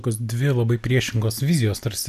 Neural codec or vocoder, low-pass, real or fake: none; 14.4 kHz; real